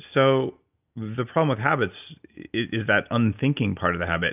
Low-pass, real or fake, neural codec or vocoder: 3.6 kHz; real; none